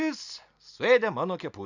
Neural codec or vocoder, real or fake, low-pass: none; real; 7.2 kHz